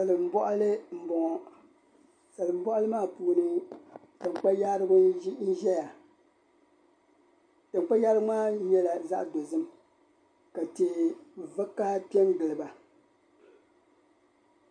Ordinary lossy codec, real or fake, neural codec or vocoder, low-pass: MP3, 64 kbps; real; none; 9.9 kHz